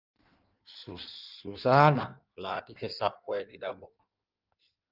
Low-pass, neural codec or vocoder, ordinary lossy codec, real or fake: 5.4 kHz; codec, 16 kHz in and 24 kHz out, 1.1 kbps, FireRedTTS-2 codec; Opus, 24 kbps; fake